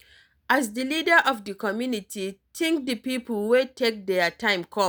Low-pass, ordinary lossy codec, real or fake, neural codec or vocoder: none; none; real; none